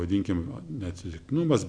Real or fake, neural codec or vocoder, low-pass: real; none; 9.9 kHz